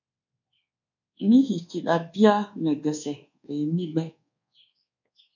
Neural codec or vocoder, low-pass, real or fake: codec, 24 kHz, 1.2 kbps, DualCodec; 7.2 kHz; fake